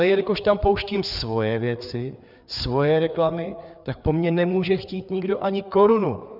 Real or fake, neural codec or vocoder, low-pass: fake; codec, 16 kHz, 4 kbps, FreqCodec, larger model; 5.4 kHz